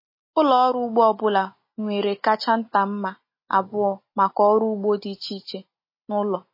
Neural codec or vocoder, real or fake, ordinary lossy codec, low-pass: none; real; MP3, 24 kbps; 5.4 kHz